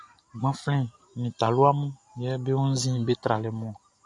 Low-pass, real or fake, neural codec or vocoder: 10.8 kHz; real; none